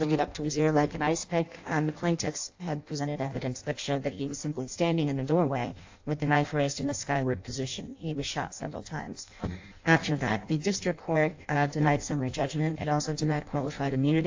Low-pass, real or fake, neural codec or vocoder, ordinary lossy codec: 7.2 kHz; fake; codec, 16 kHz in and 24 kHz out, 0.6 kbps, FireRedTTS-2 codec; AAC, 48 kbps